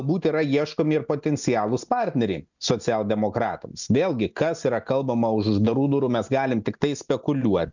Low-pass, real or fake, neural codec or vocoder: 7.2 kHz; real; none